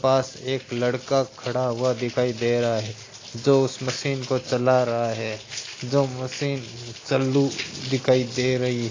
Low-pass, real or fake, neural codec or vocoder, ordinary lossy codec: 7.2 kHz; real; none; AAC, 32 kbps